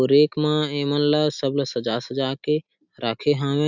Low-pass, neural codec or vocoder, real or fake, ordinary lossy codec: 7.2 kHz; none; real; none